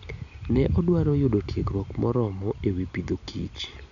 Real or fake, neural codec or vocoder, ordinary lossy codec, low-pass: real; none; none; 7.2 kHz